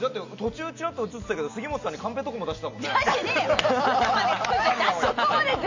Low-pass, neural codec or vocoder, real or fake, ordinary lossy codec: 7.2 kHz; none; real; AAC, 48 kbps